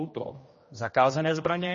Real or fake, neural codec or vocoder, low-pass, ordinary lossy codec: fake; codec, 16 kHz, 1 kbps, X-Codec, HuBERT features, trained on general audio; 7.2 kHz; MP3, 32 kbps